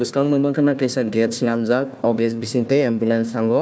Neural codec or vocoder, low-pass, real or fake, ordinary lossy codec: codec, 16 kHz, 1 kbps, FunCodec, trained on Chinese and English, 50 frames a second; none; fake; none